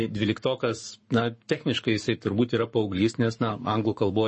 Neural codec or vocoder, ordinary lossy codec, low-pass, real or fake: codec, 44.1 kHz, 7.8 kbps, Pupu-Codec; MP3, 32 kbps; 10.8 kHz; fake